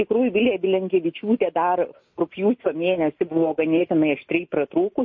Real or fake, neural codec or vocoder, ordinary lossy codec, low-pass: real; none; MP3, 32 kbps; 7.2 kHz